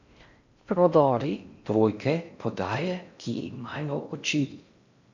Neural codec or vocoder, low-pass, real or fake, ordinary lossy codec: codec, 16 kHz in and 24 kHz out, 0.6 kbps, FocalCodec, streaming, 4096 codes; 7.2 kHz; fake; none